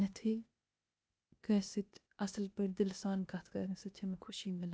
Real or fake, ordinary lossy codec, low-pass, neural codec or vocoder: fake; none; none; codec, 16 kHz, 0.8 kbps, ZipCodec